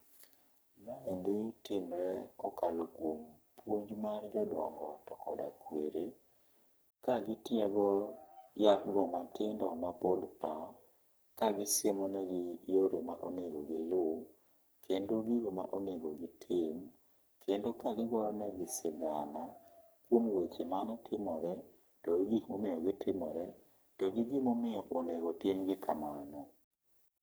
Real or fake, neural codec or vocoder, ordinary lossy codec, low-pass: fake; codec, 44.1 kHz, 3.4 kbps, Pupu-Codec; none; none